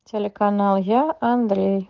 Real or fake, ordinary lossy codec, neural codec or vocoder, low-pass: real; Opus, 16 kbps; none; 7.2 kHz